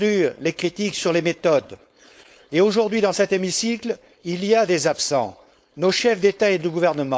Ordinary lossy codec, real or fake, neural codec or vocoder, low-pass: none; fake; codec, 16 kHz, 4.8 kbps, FACodec; none